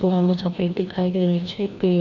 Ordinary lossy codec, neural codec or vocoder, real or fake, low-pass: none; codec, 16 kHz, 1 kbps, FreqCodec, larger model; fake; 7.2 kHz